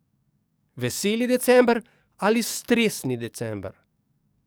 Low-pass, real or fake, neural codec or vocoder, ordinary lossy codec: none; fake; codec, 44.1 kHz, 7.8 kbps, DAC; none